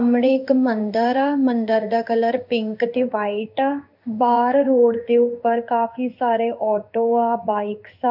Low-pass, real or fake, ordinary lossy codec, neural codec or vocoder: 5.4 kHz; fake; none; codec, 16 kHz in and 24 kHz out, 1 kbps, XY-Tokenizer